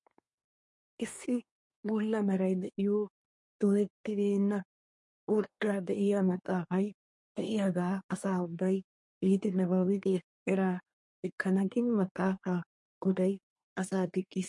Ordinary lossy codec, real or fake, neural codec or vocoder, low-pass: MP3, 48 kbps; fake; codec, 24 kHz, 1 kbps, SNAC; 10.8 kHz